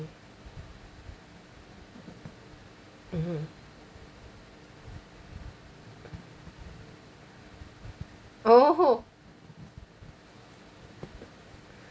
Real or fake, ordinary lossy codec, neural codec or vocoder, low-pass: real; none; none; none